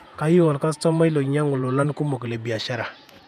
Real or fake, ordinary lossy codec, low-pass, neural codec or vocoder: fake; none; 14.4 kHz; vocoder, 48 kHz, 128 mel bands, Vocos